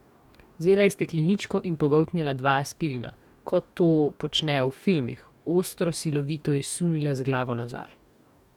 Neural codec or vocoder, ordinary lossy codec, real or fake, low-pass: codec, 44.1 kHz, 2.6 kbps, DAC; none; fake; 19.8 kHz